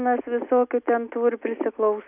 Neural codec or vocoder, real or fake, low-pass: none; real; 3.6 kHz